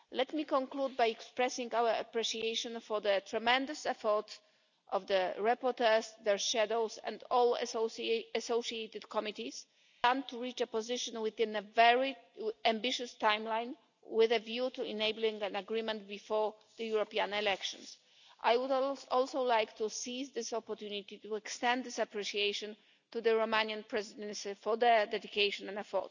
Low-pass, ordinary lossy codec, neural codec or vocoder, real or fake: 7.2 kHz; none; none; real